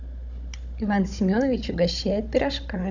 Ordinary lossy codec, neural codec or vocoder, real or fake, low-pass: none; codec, 16 kHz, 16 kbps, FunCodec, trained on Chinese and English, 50 frames a second; fake; 7.2 kHz